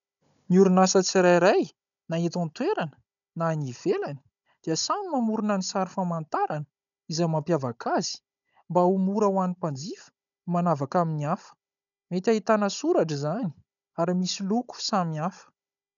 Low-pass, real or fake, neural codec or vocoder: 7.2 kHz; fake; codec, 16 kHz, 16 kbps, FunCodec, trained on Chinese and English, 50 frames a second